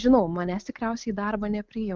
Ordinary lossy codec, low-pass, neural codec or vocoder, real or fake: Opus, 24 kbps; 7.2 kHz; none; real